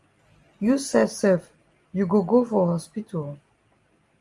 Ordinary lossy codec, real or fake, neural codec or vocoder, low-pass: Opus, 32 kbps; real; none; 10.8 kHz